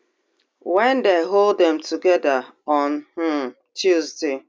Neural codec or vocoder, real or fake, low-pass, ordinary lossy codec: none; real; none; none